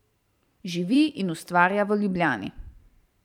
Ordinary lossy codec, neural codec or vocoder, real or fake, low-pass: none; vocoder, 44.1 kHz, 128 mel bands every 512 samples, BigVGAN v2; fake; 19.8 kHz